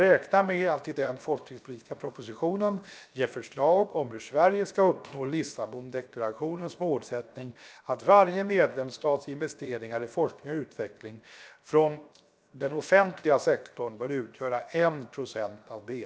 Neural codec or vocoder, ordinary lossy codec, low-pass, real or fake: codec, 16 kHz, 0.7 kbps, FocalCodec; none; none; fake